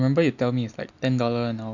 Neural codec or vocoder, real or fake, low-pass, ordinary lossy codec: none; real; 7.2 kHz; none